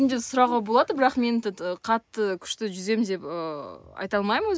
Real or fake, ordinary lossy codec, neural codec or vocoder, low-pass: real; none; none; none